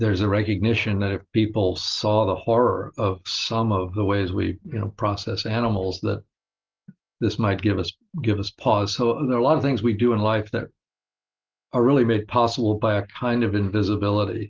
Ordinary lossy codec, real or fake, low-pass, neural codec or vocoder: Opus, 16 kbps; real; 7.2 kHz; none